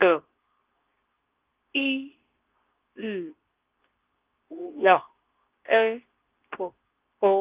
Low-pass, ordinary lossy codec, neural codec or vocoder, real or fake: 3.6 kHz; Opus, 32 kbps; codec, 24 kHz, 0.9 kbps, WavTokenizer, medium speech release version 2; fake